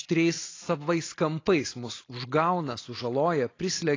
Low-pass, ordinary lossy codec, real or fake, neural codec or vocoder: 7.2 kHz; AAC, 32 kbps; real; none